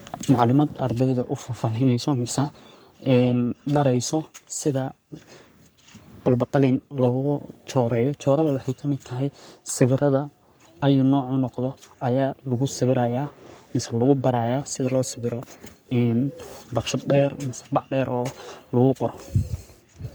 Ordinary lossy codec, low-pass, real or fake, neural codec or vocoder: none; none; fake; codec, 44.1 kHz, 3.4 kbps, Pupu-Codec